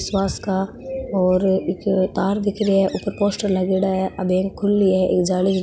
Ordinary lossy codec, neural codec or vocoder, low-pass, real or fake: none; none; none; real